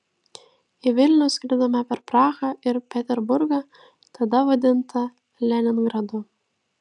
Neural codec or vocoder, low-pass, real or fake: none; 10.8 kHz; real